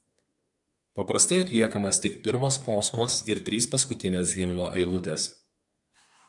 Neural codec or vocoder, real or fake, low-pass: codec, 24 kHz, 1 kbps, SNAC; fake; 10.8 kHz